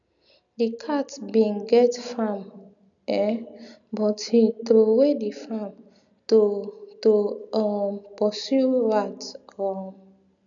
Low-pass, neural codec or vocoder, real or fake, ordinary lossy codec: 7.2 kHz; none; real; none